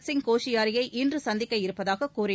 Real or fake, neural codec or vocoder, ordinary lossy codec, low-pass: real; none; none; none